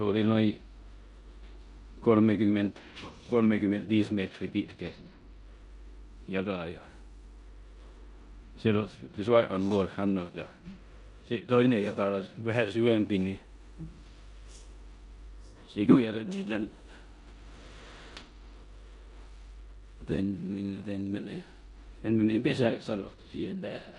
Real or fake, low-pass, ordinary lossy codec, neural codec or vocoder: fake; 10.8 kHz; none; codec, 16 kHz in and 24 kHz out, 0.9 kbps, LongCat-Audio-Codec, four codebook decoder